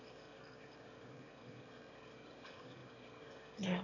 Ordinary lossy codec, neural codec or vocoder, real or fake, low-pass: none; autoencoder, 22.05 kHz, a latent of 192 numbers a frame, VITS, trained on one speaker; fake; 7.2 kHz